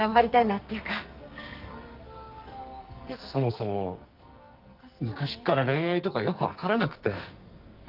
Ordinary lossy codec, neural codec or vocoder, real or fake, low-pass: Opus, 32 kbps; codec, 44.1 kHz, 2.6 kbps, SNAC; fake; 5.4 kHz